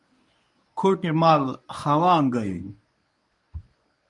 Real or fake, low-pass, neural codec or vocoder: fake; 10.8 kHz; codec, 24 kHz, 0.9 kbps, WavTokenizer, medium speech release version 1